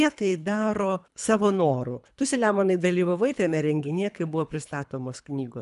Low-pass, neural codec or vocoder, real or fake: 10.8 kHz; codec, 24 kHz, 3 kbps, HILCodec; fake